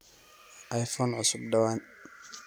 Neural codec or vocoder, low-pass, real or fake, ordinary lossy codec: none; none; real; none